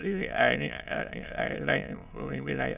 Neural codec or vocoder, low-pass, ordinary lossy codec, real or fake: autoencoder, 22.05 kHz, a latent of 192 numbers a frame, VITS, trained on many speakers; 3.6 kHz; none; fake